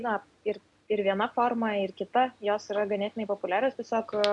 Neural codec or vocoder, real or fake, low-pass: none; real; 10.8 kHz